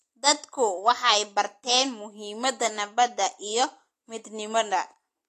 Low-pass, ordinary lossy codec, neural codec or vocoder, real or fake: 14.4 kHz; AAC, 48 kbps; none; real